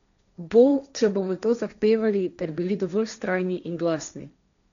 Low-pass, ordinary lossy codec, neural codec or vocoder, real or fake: 7.2 kHz; none; codec, 16 kHz, 1.1 kbps, Voila-Tokenizer; fake